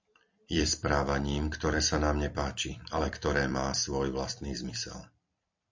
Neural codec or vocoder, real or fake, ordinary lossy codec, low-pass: vocoder, 44.1 kHz, 128 mel bands every 256 samples, BigVGAN v2; fake; MP3, 64 kbps; 7.2 kHz